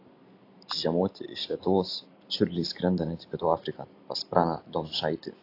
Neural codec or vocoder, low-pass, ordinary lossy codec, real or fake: none; 5.4 kHz; AAC, 32 kbps; real